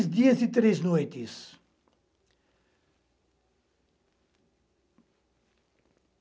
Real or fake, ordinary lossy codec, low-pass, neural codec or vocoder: real; none; none; none